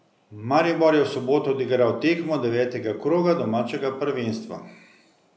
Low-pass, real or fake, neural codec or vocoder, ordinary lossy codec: none; real; none; none